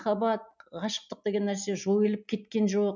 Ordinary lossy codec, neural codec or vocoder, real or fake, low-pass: none; none; real; 7.2 kHz